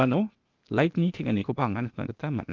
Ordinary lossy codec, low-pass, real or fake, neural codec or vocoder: Opus, 32 kbps; 7.2 kHz; fake; codec, 16 kHz, 0.8 kbps, ZipCodec